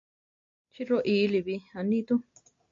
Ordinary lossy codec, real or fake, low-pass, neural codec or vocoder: AAC, 48 kbps; real; 7.2 kHz; none